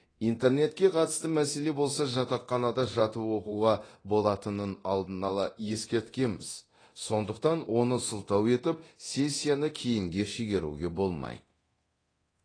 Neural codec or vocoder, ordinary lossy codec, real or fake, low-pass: codec, 24 kHz, 0.9 kbps, DualCodec; AAC, 32 kbps; fake; 9.9 kHz